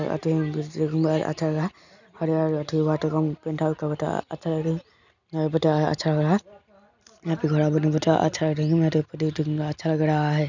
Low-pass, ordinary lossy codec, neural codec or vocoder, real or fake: 7.2 kHz; none; none; real